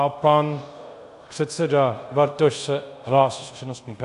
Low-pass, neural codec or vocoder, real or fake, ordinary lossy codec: 10.8 kHz; codec, 24 kHz, 0.5 kbps, DualCodec; fake; AAC, 64 kbps